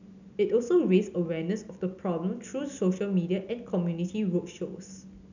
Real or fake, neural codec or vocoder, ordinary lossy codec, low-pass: real; none; none; 7.2 kHz